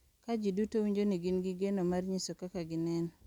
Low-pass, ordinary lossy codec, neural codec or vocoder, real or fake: 19.8 kHz; none; none; real